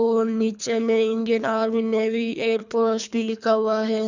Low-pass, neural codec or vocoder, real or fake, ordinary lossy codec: 7.2 kHz; codec, 24 kHz, 3 kbps, HILCodec; fake; none